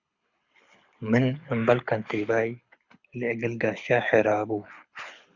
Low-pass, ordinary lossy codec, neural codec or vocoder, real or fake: 7.2 kHz; Opus, 64 kbps; codec, 24 kHz, 6 kbps, HILCodec; fake